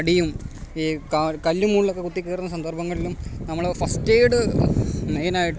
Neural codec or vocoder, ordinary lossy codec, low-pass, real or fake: none; none; none; real